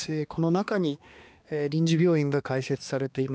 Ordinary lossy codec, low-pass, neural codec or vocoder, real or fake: none; none; codec, 16 kHz, 2 kbps, X-Codec, HuBERT features, trained on balanced general audio; fake